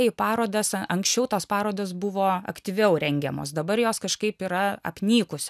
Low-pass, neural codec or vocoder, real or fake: 14.4 kHz; none; real